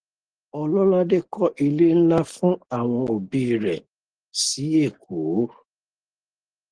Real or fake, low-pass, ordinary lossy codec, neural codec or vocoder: real; 9.9 kHz; Opus, 16 kbps; none